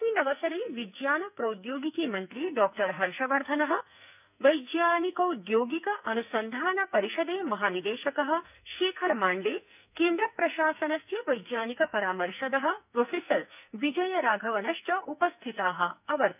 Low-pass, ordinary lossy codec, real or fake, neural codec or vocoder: 3.6 kHz; none; fake; codec, 44.1 kHz, 2.6 kbps, SNAC